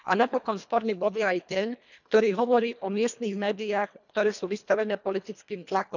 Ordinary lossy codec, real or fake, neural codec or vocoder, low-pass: none; fake; codec, 24 kHz, 1.5 kbps, HILCodec; 7.2 kHz